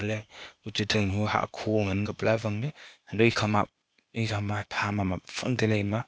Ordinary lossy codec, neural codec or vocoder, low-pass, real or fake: none; codec, 16 kHz, 0.8 kbps, ZipCodec; none; fake